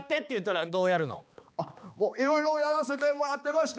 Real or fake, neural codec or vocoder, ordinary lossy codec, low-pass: fake; codec, 16 kHz, 2 kbps, X-Codec, HuBERT features, trained on balanced general audio; none; none